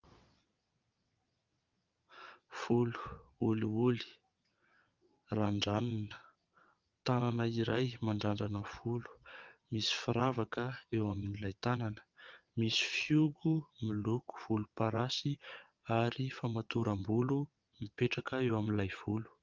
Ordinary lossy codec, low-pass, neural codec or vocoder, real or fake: Opus, 24 kbps; 7.2 kHz; vocoder, 22.05 kHz, 80 mel bands, WaveNeXt; fake